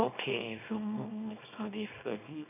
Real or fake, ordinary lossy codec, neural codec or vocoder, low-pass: fake; none; codec, 24 kHz, 0.9 kbps, WavTokenizer, small release; 3.6 kHz